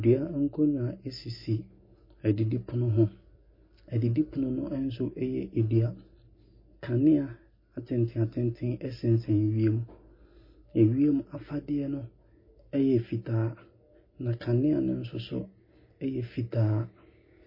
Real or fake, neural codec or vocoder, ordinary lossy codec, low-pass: real; none; MP3, 24 kbps; 5.4 kHz